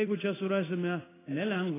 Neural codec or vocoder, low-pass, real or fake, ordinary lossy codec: codec, 16 kHz in and 24 kHz out, 1 kbps, XY-Tokenizer; 3.6 kHz; fake; AAC, 16 kbps